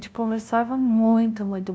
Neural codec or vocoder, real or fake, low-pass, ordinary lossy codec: codec, 16 kHz, 0.5 kbps, FunCodec, trained on LibriTTS, 25 frames a second; fake; none; none